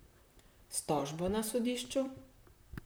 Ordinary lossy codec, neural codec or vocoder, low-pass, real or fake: none; vocoder, 44.1 kHz, 128 mel bands, Pupu-Vocoder; none; fake